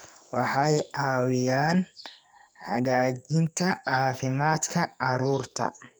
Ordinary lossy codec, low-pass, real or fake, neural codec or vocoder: none; none; fake; codec, 44.1 kHz, 2.6 kbps, SNAC